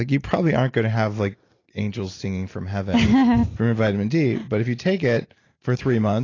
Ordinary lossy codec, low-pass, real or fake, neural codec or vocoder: AAC, 32 kbps; 7.2 kHz; real; none